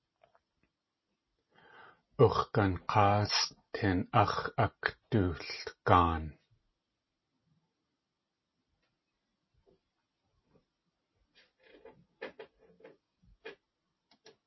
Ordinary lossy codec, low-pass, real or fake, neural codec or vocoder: MP3, 24 kbps; 7.2 kHz; real; none